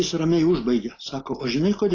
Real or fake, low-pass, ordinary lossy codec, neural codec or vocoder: real; 7.2 kHz; AAC, 32 kbps; none